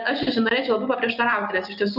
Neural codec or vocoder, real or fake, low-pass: none; real; 5.4 kHz